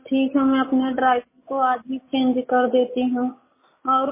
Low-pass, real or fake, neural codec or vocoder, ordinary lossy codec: 3.6 kHz; real; none; MP3, 16 kbps